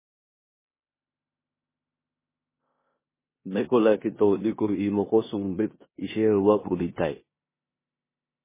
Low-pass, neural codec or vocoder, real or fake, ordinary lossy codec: 3.6 kHz; codec, 16 kHz in and 24 kHz out, 0.9 kbps, LongCat-Audio-Codec, four codebook decoder; fake; MP3, 16 kbps